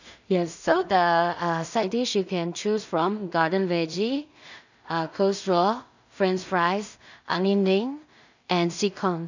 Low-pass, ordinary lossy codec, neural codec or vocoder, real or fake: 7.2 kHz; none; codec, 16 kHz in and 24 kHz out, 0.4 kbps, LongCat-Audio-Codec, two codebook decoder; fake